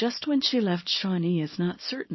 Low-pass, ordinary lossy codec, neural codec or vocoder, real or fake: 7.2 kHz; MP3, 24 kbps; codec, 24 kHz, 0.9 kbps, WavTokenizer, small release; fake